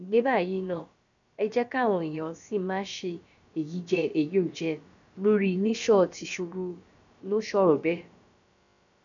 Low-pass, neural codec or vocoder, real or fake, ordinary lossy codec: 7.2 kHz; codec, 16 kHz, about 1 kbps, DyCAST, with the encoder's durations; fake; none